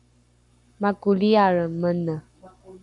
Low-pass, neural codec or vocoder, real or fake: 10.8 kHz; codec, 44.1 kHz, 7.8 kbps, Pupu-Codec; fake